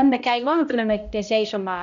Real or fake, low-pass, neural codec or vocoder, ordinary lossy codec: fake; 7.2 kHz; codec, 16 kHz, 0.5 kbps, X-Codec, HuBERT features, trained on balanced general audio; none